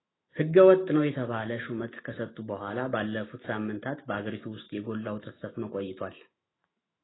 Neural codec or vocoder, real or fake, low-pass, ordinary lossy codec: autoencoder, 48 kHz, 128 numbers a frame, DAC-VAE, trained on Japanese speech; fake; 7.2 kHz; AAC, 16 kbps